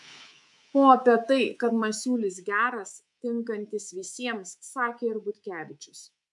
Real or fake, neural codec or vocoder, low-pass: fake; codec, 24 kHz, 3.1 kbps, DualCodec; 10.8 kHz